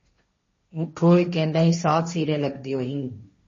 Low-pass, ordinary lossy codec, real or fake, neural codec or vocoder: 7.2 kHz; MP3, 32 kbps; fake; codec, 16 kHz, 1.1 kbps, Voila-Tokenizer